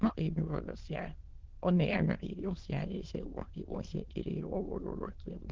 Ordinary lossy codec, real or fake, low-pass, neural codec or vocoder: Opus, 16 kbps; fake; 7.2 kHz; autoencoder, 22.05 kHz, a latent of 192 numbers a frame, VITS, trained on many speakers